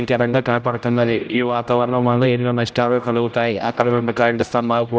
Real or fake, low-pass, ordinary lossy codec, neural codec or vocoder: fake; none; none; codec, 16 kHz, 0.5 kbps, X-Codec, HuBERT features, trained on general audio